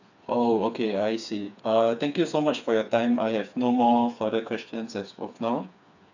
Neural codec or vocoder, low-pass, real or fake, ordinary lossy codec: codec, 16 kHz, 4 kbps, FreqCodec, smaller model; 7.2 kHz; fake; none